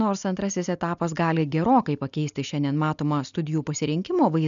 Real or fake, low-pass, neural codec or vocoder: real; 7.2 kHz; none